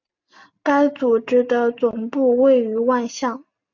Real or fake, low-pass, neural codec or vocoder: real; 7.2 kHz; none